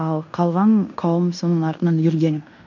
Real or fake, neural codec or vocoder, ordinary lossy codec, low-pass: fake; codec, 16 kHz in and 24 kHz out, 0.9 kbps, LongCat-Audio-Codec, fine tuned four codebook decoder; none; 7.2 kHz